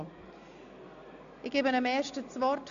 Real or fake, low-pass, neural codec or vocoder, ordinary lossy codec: real; 7.2 kHz; none; none